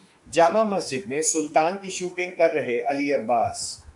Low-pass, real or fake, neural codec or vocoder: 10.8 kHz; fake; autoencoder, 48 kHz, 32 numbers a frame, DAC-VAE, trained on Japanese speech